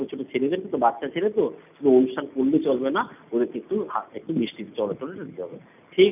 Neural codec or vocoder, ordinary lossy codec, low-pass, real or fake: none; none; 3.6 kHz; real